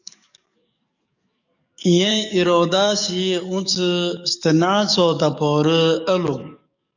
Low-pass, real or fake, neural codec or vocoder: 7.2 kHz; fake; codec, 44.1 kHz, 7.8 kbps, DAC